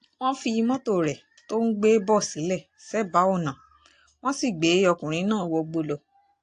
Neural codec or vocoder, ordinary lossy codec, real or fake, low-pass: none; AAC, 48 kbps; real; 9.9 kHz